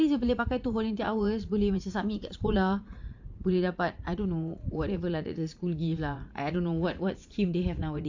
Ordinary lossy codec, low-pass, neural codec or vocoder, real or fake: AAC, 48 kbps; 7.2 kHz; vocoder, 44.1 kHz, 80 mel bands, Vocos; fake